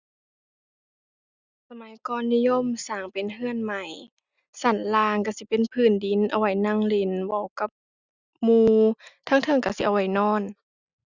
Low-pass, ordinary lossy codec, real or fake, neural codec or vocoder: 7.2 kHz; Opus, 64 kbps; real; none